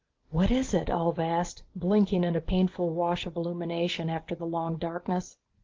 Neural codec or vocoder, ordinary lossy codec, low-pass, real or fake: none; Opus, 16 kbps; 7.2 kHz; real